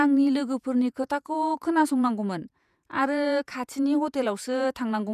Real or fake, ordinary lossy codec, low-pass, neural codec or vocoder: fake; none; 14.4 kHz; vocoder, 48 kHz, 128 mel bands, Vocos